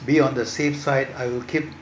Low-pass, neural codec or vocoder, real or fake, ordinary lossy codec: none; none; real; none